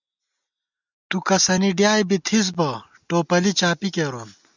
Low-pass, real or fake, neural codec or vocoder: 7.2 kHz; real; none